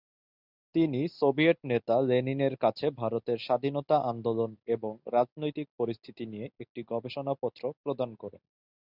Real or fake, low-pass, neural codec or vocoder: real; 5.4 kHz; none